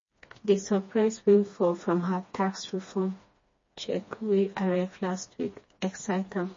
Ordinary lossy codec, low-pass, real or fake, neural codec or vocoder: MP3, 32 kbps; 7.2 kHz; fake; codec, 16 kHz, 2 kbps, FreqCodec, smaller model